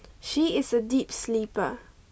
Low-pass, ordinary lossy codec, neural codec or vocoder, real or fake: none; none; none; real